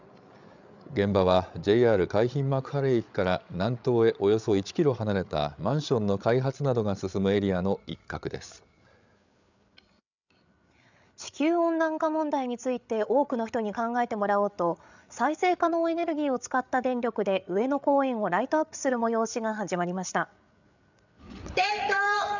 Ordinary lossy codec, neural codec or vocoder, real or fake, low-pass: none; codec, 16 kHz, 8 kbps, FreqCodec, larger model; fake; 7.2 kHz